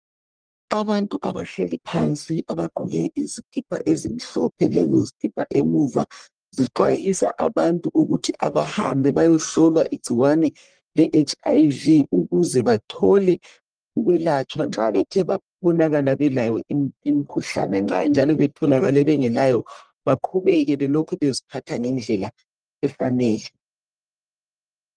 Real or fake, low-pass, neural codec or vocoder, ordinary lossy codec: fake; 9.9 kHz; codec, 44.1 kHz, 1.7 kbps, Pupu-Codec; Opus, 32 kbps